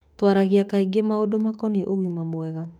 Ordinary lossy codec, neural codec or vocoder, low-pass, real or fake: none; autoencoder, 48 kHz, 32 numbers a frame, DAC-VAE, trained on Japanese speech; 19.8 kHz; fake